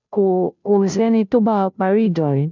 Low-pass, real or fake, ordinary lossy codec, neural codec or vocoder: 7.2 kHz; fake; none; codec, 16 kHz, 0.5 kbps, FunCodec, trained on Chinese and English, 25 frames a second